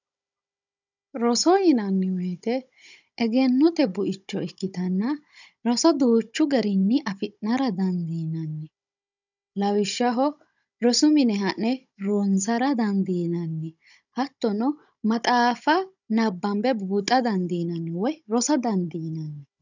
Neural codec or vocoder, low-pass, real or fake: codec, 16 kHz, 16 kbps, FunCodec, trained on Chinese and English, 50 frames a second; 7.2 kHz; fake